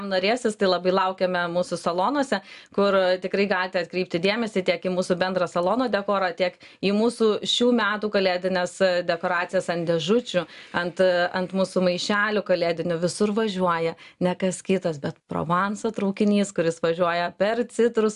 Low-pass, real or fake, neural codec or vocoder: 10.8 kHz; real; none